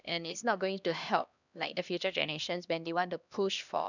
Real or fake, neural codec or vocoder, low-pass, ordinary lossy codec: fake; codec, 16 kHz, 1 kbps, X-Codec, HuBERT features, trained on LibriSpeech; 7.2 kHz; none